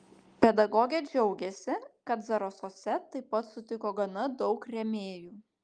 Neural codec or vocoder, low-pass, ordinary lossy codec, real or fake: none; 9.9 kHz; Opus, 32 kbps; real